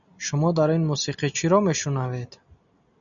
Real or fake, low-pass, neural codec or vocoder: real; 7.2 kHz; none